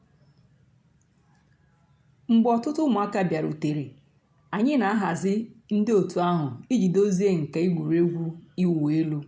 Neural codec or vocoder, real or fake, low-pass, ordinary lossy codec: none; real; none; none